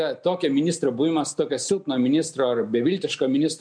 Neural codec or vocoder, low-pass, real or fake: none; 9.9 kHz; real